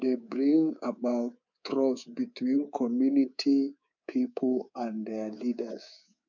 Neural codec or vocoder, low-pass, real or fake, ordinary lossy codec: codec, 24 kHz, 3.1 kbps, DualCodec; 7.2 kHz; fake; none